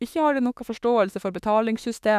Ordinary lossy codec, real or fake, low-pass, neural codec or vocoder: none; fake; 14.4 kHz; autoencoder, 48 kHz, 32 numbers a frame, DAC-VAE, trained on Japanese speech